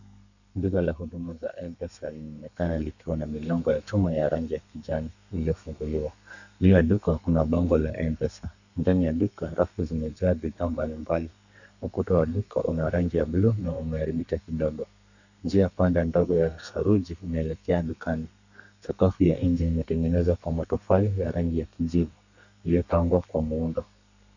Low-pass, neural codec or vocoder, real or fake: 7.2 kHz; codec, 32 kHz, 1.9 kbps, SNAC; fake